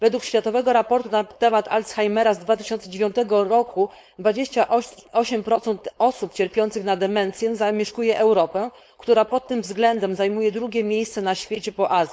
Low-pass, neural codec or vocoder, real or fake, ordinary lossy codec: none; codec, 16 kHz, 4.8 kbps, FACodec; fake; none